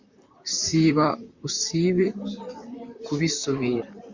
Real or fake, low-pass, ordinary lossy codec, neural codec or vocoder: fake; 7.2 kHz; Opus, 64 kbps; vocoder, 44.1 kHz, 128 mel bands, Pupu-Vocoder